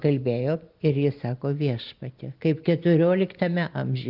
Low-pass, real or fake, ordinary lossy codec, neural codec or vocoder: 5.4 kHz; real; Opus, 24 kbps; none